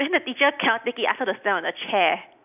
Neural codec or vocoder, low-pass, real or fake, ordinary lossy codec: none; 3.6 kHz; real; none